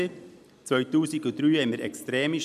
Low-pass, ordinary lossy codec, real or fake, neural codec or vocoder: 14.4 kHz; MP3, 96 kbps; real; none